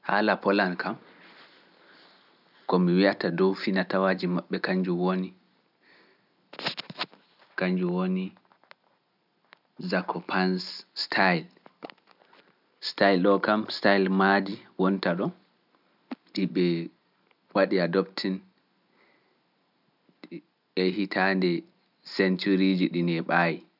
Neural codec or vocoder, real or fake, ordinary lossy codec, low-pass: none; real; none; 5.4 kHz